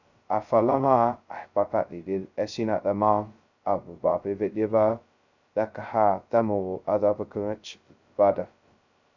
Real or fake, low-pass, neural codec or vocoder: fake; 7.2 kHz; codec, 16 kHz, 0.2 kbps, FocalCodec